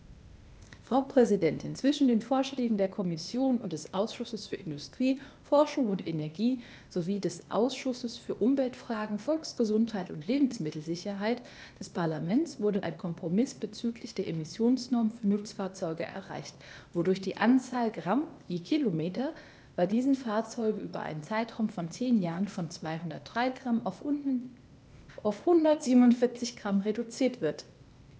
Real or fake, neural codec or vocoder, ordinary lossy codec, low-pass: fake; codec, 16 kHz, 0.8 kbps, ZipCodec; none; none